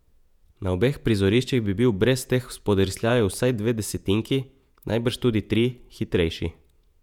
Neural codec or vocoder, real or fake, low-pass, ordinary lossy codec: vocoder, 48 kHz, 128 mel bands, Vocos; fake; 19.8 kHz; none